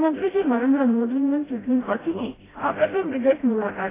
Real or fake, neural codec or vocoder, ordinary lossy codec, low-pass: fake; codec, 16 kHz, 0.5 kbps, FreqCodec, smaller model; AAC, 16 kbps; 3.6 kHz